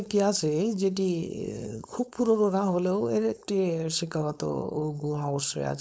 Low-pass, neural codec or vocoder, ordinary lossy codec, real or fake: none; codec, 16 kHz, 4.8 kbps, FACodec; none; fake